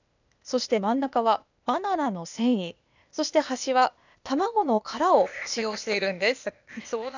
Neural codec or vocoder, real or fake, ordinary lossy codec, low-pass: codec, 16 kHz, 0.8 kbps, ZipCodec; fake; none; 7.2 kHz